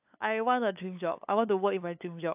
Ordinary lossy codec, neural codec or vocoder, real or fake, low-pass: AAC, 32 kbps; codec, 16 kHz, 8 kbps, FunCodec, trained on LibriTTS, 25 frames a second; fake; 3.6 kHz